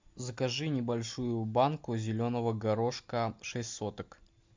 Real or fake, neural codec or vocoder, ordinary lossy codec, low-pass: real; none; MP3, 64 kbps; 7.2 kHz